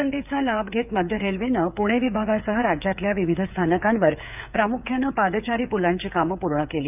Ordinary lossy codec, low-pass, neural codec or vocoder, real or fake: none; 3.6 kHz; codec, 16 kHz, 8 kbps, FreqCodec, smaller model; fake